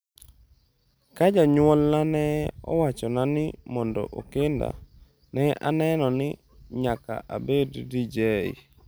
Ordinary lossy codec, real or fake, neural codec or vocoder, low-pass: none; real; none; none